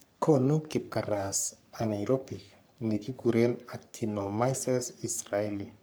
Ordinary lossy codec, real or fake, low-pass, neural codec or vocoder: none; fake; none; codec, 44.1 kHz, 3.4 kbps, Pupu-Codec